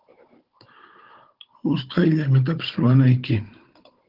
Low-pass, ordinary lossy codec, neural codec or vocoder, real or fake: 5.4 kHz; Opus, 16 kbps; codec, 16 kHz, 16 kbps, FunCodec, trained on Chinese and English, 50 frames a second; fake